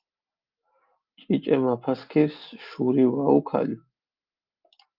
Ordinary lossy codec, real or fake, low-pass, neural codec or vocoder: Opus, 32 kbps; real; 5.4 kHz; none